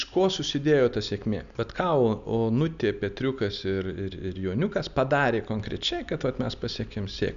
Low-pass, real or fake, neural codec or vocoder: 7.2 kHz; real; none